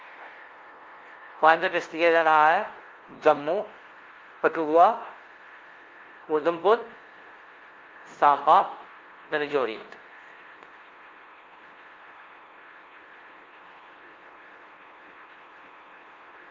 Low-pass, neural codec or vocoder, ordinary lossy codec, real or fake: 7.2 kHz; codec, 16 kHz, 0.5 kbps, FunCodec, trained on LibriTTS, 25 frames a second; Opus, 16 kbps; fake